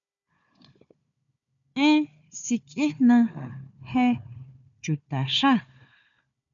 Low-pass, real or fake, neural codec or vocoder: 7.2 kHz; fake; codec, 16 kHz, 4 kbps, FunCodec, trained on Chinese and English, 50 frames a second